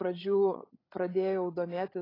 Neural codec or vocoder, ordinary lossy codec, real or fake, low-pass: codec, 16 kHz, 16 kbps, FreqCodec, larger model; AAC, 24 kbps; fake; 5.4 kHz